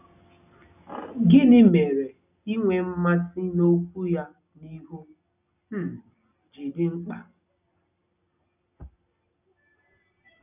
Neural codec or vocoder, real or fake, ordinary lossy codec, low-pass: none; real; none; 3.6 kHz